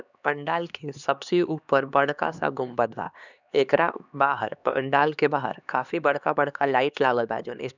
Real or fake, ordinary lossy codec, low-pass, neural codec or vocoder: fake; none; 7.2 kHz; codec, 16 kHz, 2 kbps, X-Codec, HuBERT features, trained on LibriSpeech